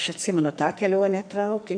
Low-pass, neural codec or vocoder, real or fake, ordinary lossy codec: 9.9 kHz; codec, 44.1 kHz, 2.6 kbps, SNAC; fake; AAC, 64 kbps